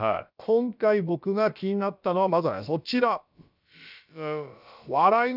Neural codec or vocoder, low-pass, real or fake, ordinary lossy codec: codec, 16 kHz, about 1 kbps, DyCAST, with the encoder's durations; 5.4 kHz; fake; none